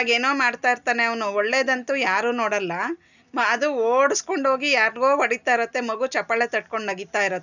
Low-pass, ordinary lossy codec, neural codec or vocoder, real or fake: 7.2 kHz; none; none; real